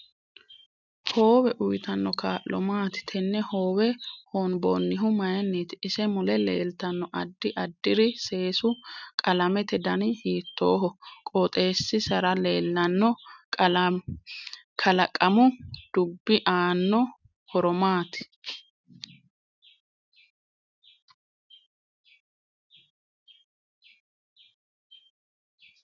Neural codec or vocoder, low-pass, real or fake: none; 7.2 kHz; real